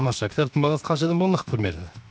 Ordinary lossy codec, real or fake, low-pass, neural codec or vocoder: none; fake; none; codec, 16 kHz, 0.7 kbps, FocalCodec